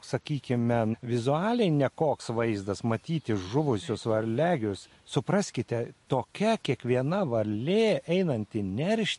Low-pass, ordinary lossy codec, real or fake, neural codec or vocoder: 14.4 kHz; MP3, 48 kbps; real; none